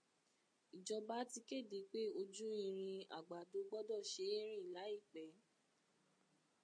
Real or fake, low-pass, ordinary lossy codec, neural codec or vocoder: real; 9.9 kHz; MP3, 48 kbps; none